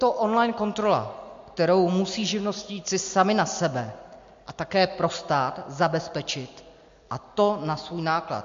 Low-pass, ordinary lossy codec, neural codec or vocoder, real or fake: 7.2 kHz; MP3, 48 kbps; none; real